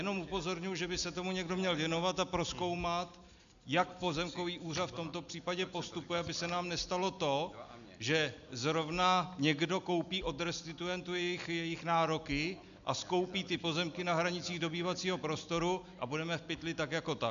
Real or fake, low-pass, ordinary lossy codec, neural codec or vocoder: real; 7.2 kHz; AAC, 96 kbps; none